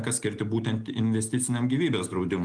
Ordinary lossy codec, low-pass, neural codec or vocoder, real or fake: Opus, 24 kbps; 9.9 kHz; none; real